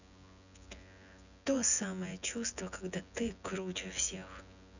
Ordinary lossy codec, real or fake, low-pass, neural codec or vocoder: none; fake; 7.2 kHz; vocoder, 24 kHz, 100 mel bands, Vocos